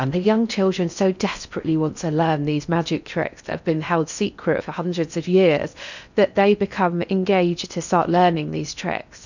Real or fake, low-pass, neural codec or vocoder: fake; 7.2 kHz; codec, 16 kHz in and 24 kHz out, 0.6 kbps, FocalCodec, streaming, 4096 codes